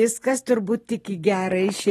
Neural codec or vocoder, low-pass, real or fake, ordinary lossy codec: vocoder, 44.1 kHz, 128 mel bands every 256 samples, BigVGAN v2; 19.8 kHz; fake; AAC, 32 kbps